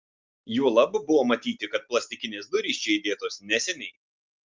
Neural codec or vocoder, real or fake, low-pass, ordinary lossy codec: none; real; 7.2 kHz; Opus, 24 kbps